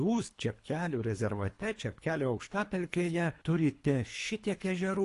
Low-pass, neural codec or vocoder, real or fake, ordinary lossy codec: 10.8 kHz; codec, 24 kHz, 3 kbps, HILCodec; fake; AAC, 48 kbps